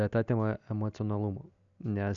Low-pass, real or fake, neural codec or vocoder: 7.2 kHz; real; none